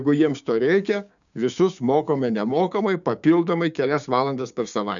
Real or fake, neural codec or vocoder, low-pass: fake; codec, 16 kHz, 6 kbps, DAC; 7.2 kHz